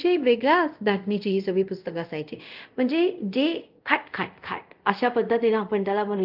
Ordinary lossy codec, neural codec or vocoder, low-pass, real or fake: Opus, 32 kbps; codec, 24 kHz, 0.5 kbps, DualCodec; 5.4 kHz; fake